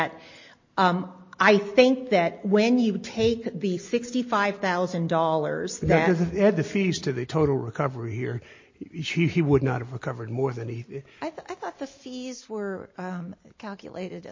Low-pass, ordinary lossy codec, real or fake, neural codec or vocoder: 7.2 kHz; MP3, 32 kbps; real; none